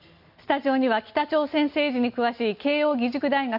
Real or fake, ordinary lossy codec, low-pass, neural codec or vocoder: real; none; 5.4 kHz; none